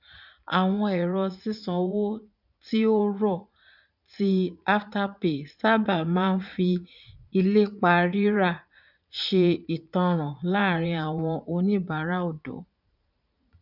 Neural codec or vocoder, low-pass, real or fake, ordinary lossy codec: vocoder, 44.1 kHz, 80 mel bands, Vocos; 5.4 kHz; fake; none